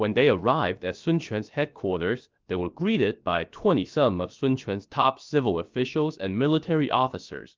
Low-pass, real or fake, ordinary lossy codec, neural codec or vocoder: 7.2 kHz; fake; Opus, 32 kbps; codec, 16 kHz, 0.7 kbps, FocalCodec